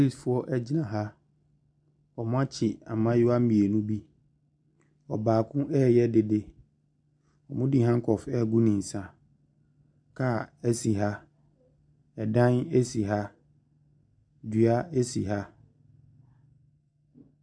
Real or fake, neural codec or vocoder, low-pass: real; none; 9.9 kHz